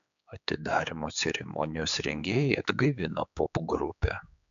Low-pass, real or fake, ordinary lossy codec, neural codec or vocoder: 7.2 kHz; fake; MP3, 96 kbps; codec, 16 kHz, 4 kbps, X-Codec, HuBERT features, trained on general audio